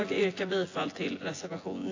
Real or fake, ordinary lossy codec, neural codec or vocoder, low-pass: fake; AAC, 32 kbps; vocoder, 24 kHz, 100 mel bands, Vocos; 7.2 kHz